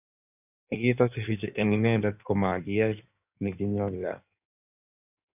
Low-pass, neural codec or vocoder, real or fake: 3.6 kHz; codec, 16 kHz in and 24 kHz out, 1.1 kbps, FireRedTTS-2 codec; fake